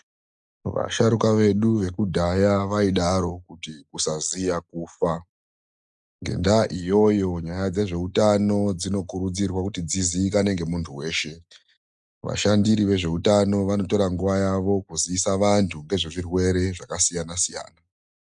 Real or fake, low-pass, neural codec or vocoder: real; 10.8 kHz; none